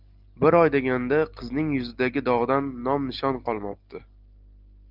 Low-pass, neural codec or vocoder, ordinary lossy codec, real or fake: 5.4 kHz; none; Opus, 32 kbps; real